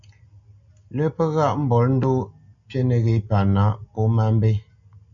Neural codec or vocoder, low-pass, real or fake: none; 7.2 kHz; real